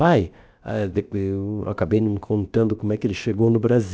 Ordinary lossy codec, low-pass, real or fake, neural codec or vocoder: none; none; fake; codec, 16 kHz, about 1 kbps, DyCAST, with the encoder's durations